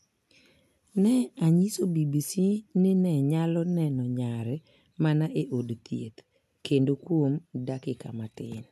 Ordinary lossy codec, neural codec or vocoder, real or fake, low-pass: none; none; real; 14.4 kHz